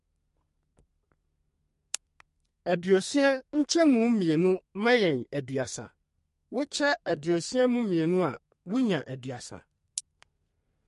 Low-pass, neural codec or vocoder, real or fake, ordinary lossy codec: 14.4 kHz; codec, 32 kHz, 1.9 kbps, SNAC; fake; MP3, 48 kbps